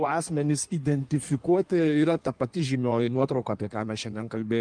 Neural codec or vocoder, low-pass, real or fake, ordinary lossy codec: codec, 16 kHz in and 24 kHz out, 1.1 kbps, FireRedTTS-2 codec; 9.9 kHz; fake; Opus, 32 kbps